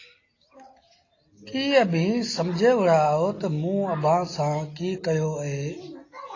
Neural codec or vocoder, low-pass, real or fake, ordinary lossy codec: none; 7.2 kHz; real; AAC, 32 kbps